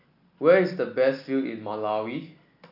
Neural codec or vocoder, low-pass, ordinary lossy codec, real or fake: none; 5.4 kHz; none; real